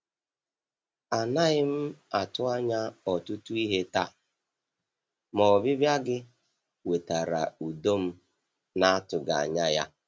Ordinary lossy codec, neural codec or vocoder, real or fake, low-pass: none; none; real; none